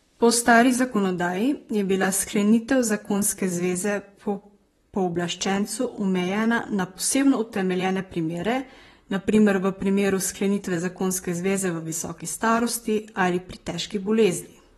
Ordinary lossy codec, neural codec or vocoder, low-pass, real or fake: AAC, 32 kbps; vocoder, 44.1 kHz, 128 mel bands, Pupu-Vocoder; 19.8 kHz; fake